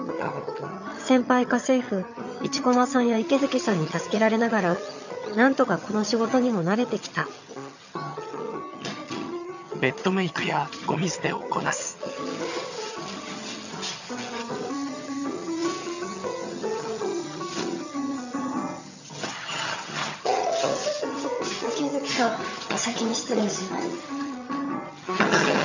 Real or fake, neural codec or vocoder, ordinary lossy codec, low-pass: fake; vocoder, 22.05 kHz, 80 mel bands, HiFi-GAN; none; 7.2 kHz